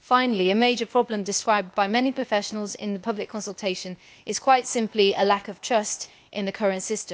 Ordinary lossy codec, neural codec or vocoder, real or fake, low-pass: none; codec, 16 kHz, 0.8 kbps, ZipCodec; fake; none